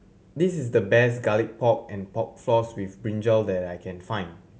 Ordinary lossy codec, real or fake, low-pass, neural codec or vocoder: none; real; none; none